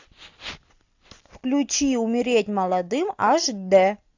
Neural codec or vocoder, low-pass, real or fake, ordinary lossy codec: none; 7.2 kHz; real; AAC, 48 kbps